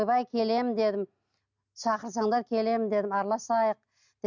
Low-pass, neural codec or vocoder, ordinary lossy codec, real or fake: 7.2 kHz; none; none; real